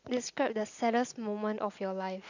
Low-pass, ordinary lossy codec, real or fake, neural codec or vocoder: 7.2 kHz; none; real; none